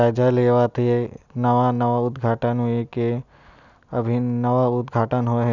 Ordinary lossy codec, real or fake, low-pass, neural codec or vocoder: none; real; 7.2 kHz; none